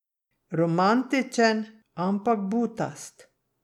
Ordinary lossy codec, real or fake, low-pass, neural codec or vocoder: none; real; 19.8 kHz; none